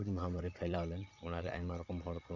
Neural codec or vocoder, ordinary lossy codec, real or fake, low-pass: vocoder, 22.05 kHz, 80 mel bands, Vocos; none; fake; 7.2 kHz